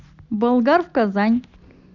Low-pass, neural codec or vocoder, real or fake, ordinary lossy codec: 7.2 kHz; none; real; none